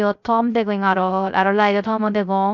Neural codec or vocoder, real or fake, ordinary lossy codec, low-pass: codec, 16 kHz, 0.3 kbps, FocalCodec; fake; none; 7.2 kHz